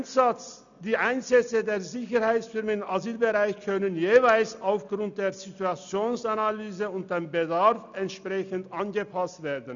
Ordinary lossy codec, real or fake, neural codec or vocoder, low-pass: none; real; none; 7.2 kHz